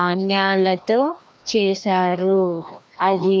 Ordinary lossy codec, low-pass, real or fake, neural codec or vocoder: none; none; fake; codec, 16 kHz, 1 kbps, FreqCodec, larger model